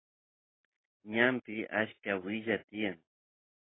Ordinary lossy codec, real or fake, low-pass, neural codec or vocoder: AAC, 16 kbps; real; 7.2 kHz; none